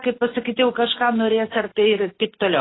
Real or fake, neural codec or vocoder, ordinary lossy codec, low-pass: real; none; AAC, 16 kbps; 7.2 kHz